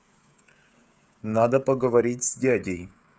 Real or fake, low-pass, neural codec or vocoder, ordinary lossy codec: fake; none; codec, 16 kHz, 16 kbps, FreqCodec, smaller model; none